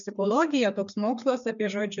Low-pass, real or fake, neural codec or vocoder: 7.2 kHz; fake; codec, 16 kHz, 4 kbps, FreqCodec, larger model